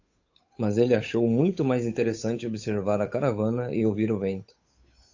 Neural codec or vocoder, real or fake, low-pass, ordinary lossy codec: codec, 16 kHz, 8 kbps, FunCodec, trained on Chinese and English, 25 frames a second; fake; 7.2 kHz; AAC, 48 kbps